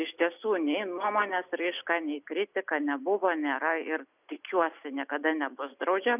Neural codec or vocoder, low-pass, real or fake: none; 3.6 kHz; real